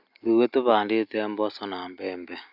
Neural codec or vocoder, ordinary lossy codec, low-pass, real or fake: none; none; 5.4 kHz; real